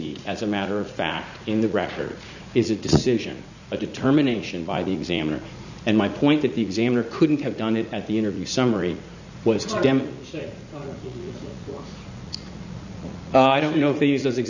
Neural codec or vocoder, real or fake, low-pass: vocoder, 44.1 kHz, 80 mel bands, Vocos; fake; 7.2 kHz